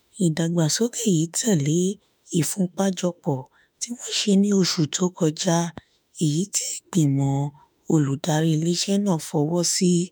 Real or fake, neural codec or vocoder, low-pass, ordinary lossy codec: fake; autoencoder, 48 kHz, 32 numbers a frame, DAC-VAE, trained on Japanese speech; none; none